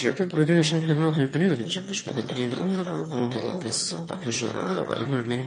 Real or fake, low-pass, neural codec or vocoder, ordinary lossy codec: fake; 9.9 kHz; autoencoder, 22.05 kHz, a latent of 192 numbers a frame, VITS, trained on one speaker; MP3, 48 kbps